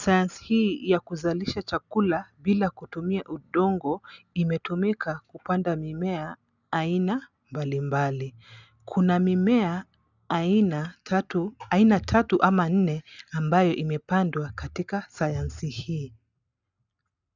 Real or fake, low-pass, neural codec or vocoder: real; 7.2 kHz; none